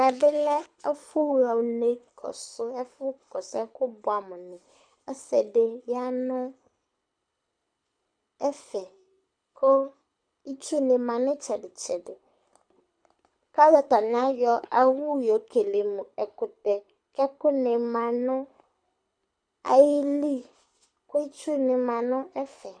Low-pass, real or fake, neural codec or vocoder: 9.9 kHz; fake; codec, 24 kHz, 6 kbps, HILCodec